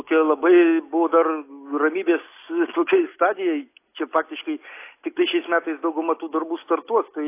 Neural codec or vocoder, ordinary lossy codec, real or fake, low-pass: none; AAC, 24 kbps; real; 3.6 kHz